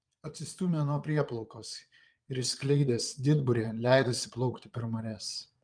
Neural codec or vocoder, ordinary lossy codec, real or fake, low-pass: vocoder, 22.05 kHz, 80 mel bands, Vocos; Opus, 32 kbps; fake; 9.9 kHz